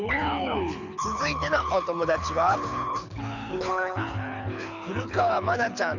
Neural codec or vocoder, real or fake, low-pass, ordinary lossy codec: codec, 24 kHz, 6 kbps, HILCodec; fake; 7.2 kHz; none